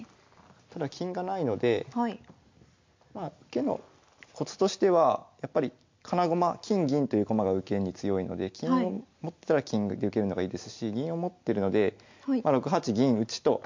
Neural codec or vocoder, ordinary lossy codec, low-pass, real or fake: none; MP3, 48 kbps; 7.2 kHz; real